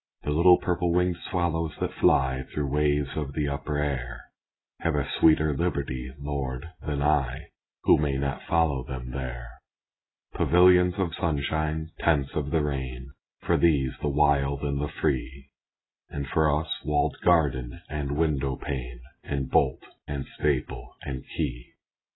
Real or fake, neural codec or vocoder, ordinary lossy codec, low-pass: real; none; AAC, 16 kbps; 7.2 kHz